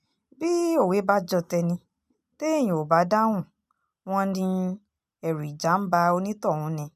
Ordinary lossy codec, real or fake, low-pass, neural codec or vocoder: none; real; 14.4 kHz; none